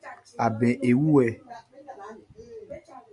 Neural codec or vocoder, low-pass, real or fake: none; 10.8 kHz; real